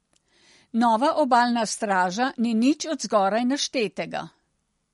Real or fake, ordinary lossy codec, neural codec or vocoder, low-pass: real; MP3, 48 kbps; none; 19.8 kHz